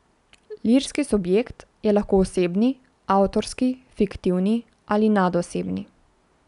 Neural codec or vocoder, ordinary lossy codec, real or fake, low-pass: none; none; real; 10.8 kHz